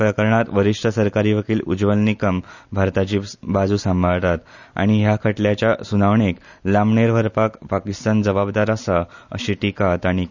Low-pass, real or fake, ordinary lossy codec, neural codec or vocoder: 7.2 kHz; real; none; none